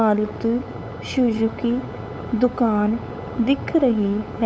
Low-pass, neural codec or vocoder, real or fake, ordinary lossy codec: none; codec, 16 kHz, 8 kbps, FunCodec, trained on LibriTTS, 25 frames a second; fake; none